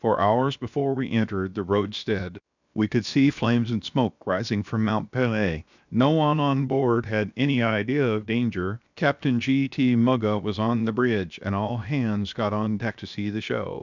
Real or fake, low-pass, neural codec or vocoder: fake; 7.2 kHz; codec, 16 kHz, 0.7 kbps, FocalCodec